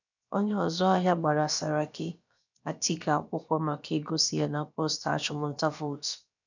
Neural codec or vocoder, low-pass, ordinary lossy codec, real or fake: codec, 16 kHz, about 1 kbps, DyCAST, with the encoder's durations; 7.2 kHz; none; fake